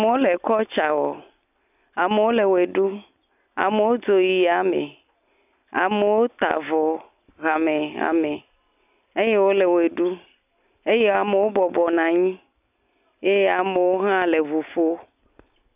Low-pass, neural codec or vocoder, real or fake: 3.6 kHz; none; real